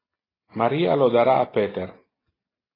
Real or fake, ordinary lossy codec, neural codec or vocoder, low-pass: real; AAC, 24 kbps; none; 5.4 kHz